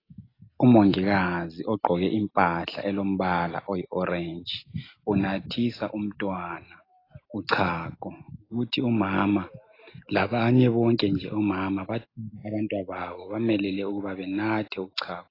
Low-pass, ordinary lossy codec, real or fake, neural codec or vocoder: 5.4 kHz; AAC, 24 kbps; real; none